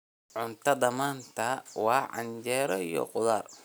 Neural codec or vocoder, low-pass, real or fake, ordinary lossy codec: none; none; real; none